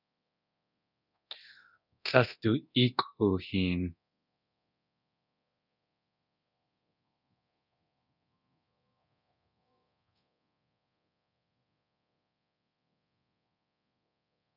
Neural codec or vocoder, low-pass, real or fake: codec, 24 kHz, 0.9 kbps, DualCodec; 5.4 kHz; fake